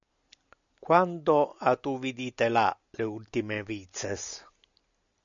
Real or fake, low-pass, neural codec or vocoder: real; 7.2 kHz; none